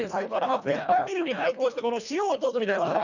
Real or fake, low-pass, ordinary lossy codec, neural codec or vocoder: fake; 7.2 kHz; none; codec, 24 kHz, 1.5 kbps, HILCodec